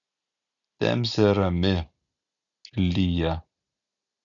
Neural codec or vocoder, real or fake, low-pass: none; real; 7.2 kHz